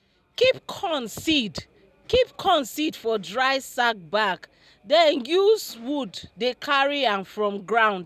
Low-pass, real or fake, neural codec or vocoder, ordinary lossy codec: 14.4 kHz; real; none; none